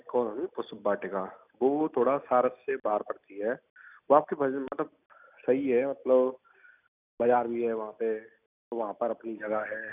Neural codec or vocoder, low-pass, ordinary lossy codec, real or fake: none; 3.6 kHz; none; real